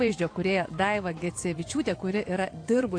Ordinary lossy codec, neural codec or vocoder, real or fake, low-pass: AAC, 48 kbps; vocoder, 24 kHz, 100 mel bands, Vocos; fake; 9.9 kHz